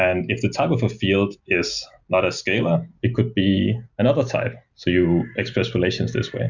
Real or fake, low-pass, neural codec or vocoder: real; 7.2 kHz; none